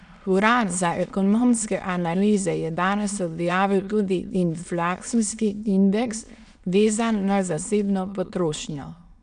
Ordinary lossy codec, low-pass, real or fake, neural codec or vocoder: none; 9.9 kHz; fake; autoencoder, 22.05 kHz, a latent of 192 numbers a frame, VITS, trained on many speakers